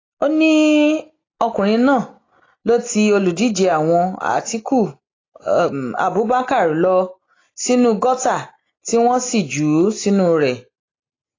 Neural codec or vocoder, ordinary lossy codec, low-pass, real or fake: none; AAC, 32 kbps; 7.2 kHz; real